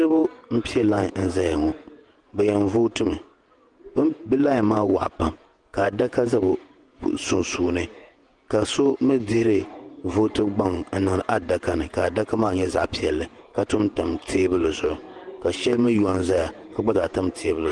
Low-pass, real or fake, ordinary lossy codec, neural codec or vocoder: 10.8 kHz; fake; Opus, 24 kbps; vocoder, 48 kHz, 128 mel bands, Vocos